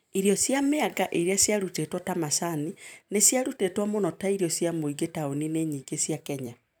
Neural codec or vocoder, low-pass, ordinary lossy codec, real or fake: vocoder, 44.1 kHz, 128 mel bands every 256 samples, BigVGAN v2; none; none; fake